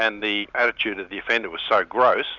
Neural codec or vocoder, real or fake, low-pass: none; real; 7.2 kHz